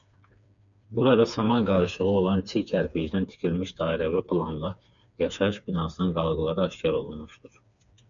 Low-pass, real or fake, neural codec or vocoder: 7.2 kHz; fake; codec, 16 kHz, 4 kbps, FreqCodec, smaller model